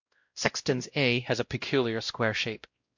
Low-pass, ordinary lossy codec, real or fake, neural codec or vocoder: 7.2 kHz; MP3, 48 kbps; fake; codec, 16 kHz, 0.5 kbps, X-Codec, WavLM features, trained on Multilingual LibriSpeech